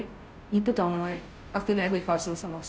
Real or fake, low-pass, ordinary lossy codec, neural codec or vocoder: fake; none; none; codec, 16 kHz, 0.5 kbps, FunCodec, trained on Chinese and English, 25 frames a second